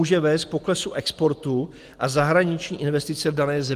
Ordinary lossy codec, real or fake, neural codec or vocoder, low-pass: Opus, 24 kbps; real; none; 14.4 kHz